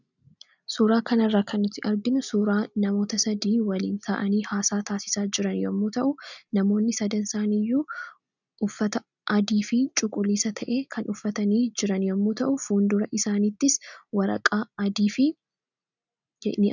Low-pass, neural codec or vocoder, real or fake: 7.2 kHz; none; real